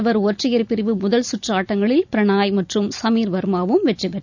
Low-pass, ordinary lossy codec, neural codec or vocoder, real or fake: 7.2 kHz; none; none; real